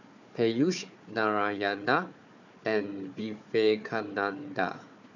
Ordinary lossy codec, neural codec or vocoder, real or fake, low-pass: none; codec, 16 kHz, 4 kbps, FunCodec, trained on Chinese and English, 50 frames a second; fake; 7.2 kHz